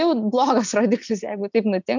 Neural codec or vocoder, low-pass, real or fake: none; 7.2 kHz; real